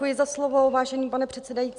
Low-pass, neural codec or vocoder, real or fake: 9.9 kHz; none; real